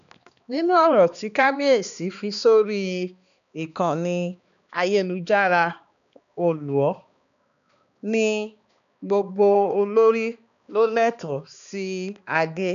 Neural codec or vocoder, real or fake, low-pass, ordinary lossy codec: codec, 16 kHz, 2 kbps, X-Codec, HuBERT features, trained on balanced general audio; fake; 7.2 kHz; none